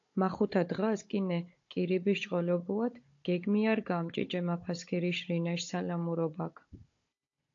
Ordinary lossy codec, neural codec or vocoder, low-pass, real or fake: AAC, 48 kbps; codec, 16 kHz, 16 kbps, FunCodec, trained on Chinese and English, 50 frames a second; 7.2 kHz; fake